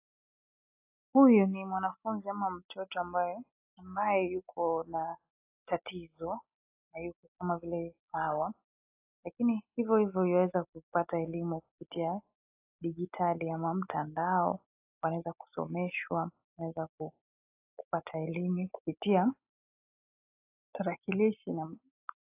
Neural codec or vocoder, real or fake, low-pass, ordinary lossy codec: none; real; 3.6 kHz; AAC, 24 kbps